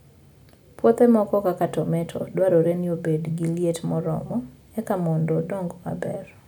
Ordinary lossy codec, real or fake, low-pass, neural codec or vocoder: none; real; none; none